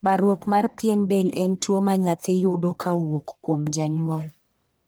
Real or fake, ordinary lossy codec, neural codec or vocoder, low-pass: fake; none; codec, 44.1 kHz, 1.7 kbps, Pupu-Codec; none